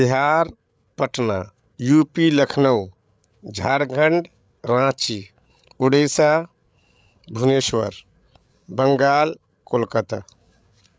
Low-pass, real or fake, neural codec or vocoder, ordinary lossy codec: none; fake; codec, 16 kHz, 8 kbps, FreqCodec, larger model; none